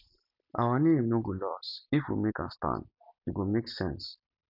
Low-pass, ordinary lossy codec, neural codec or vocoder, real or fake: 5.4 kHz; none; none; real